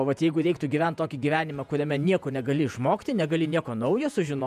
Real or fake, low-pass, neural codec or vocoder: fake; 14.4 kHz; vocoder, 48 kHz, 128 mel bands, Vocos